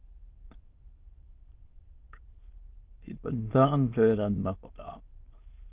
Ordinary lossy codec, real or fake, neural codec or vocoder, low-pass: Opus, 16 kbps; fake; autoencoder, 22.05 kHz, a latent of 192 numbers a frame, VITS, trained on many speakers; 3.6 kHz